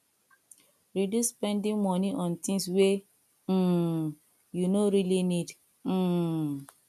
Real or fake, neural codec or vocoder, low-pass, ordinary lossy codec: real; none; 14.4 kHz; none